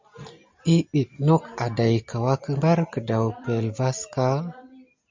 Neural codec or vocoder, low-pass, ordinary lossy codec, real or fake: none; 7.2 kHz; MP3, 64 kbps; real